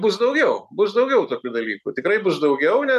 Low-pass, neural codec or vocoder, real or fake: 14.4 kHz; none; real